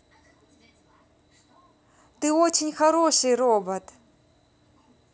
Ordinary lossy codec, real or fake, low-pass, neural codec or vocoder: none; real; none; none